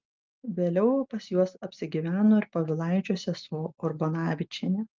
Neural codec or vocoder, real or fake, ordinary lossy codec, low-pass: none; real; Opus, 24 kbps; 7.2 kHz